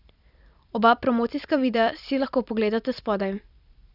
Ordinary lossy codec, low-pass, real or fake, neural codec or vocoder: none; 5.4 kHz; fake; vocoder, 44.1 kHz, 128 mel bands every 256 samples, BigVGAN v2